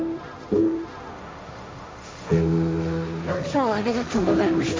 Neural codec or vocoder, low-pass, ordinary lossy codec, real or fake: codec, 16 kHz, 1.1 kbps, Voila-Tokenizer; none; none; fake